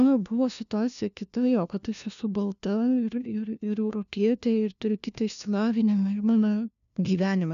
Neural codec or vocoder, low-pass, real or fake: codec, 16 kHz, 1 kbps, FunCodec, trained on LibriTTS, 50 frames a second; 7.2 kHz; fake